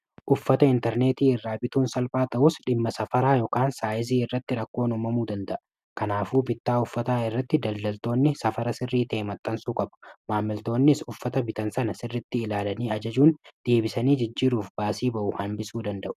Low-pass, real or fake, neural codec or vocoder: 14.4 kHz; real; none